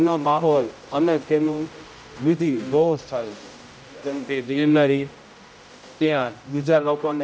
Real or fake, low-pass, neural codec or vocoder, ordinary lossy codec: fake; none; codec, 16 kHz, 0.5 kbps, X-Codec, HuBERT features, trained on general audio; none